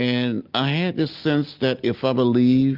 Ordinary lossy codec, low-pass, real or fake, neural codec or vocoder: Opus, 24 kbps; 5.4 kHz; real; none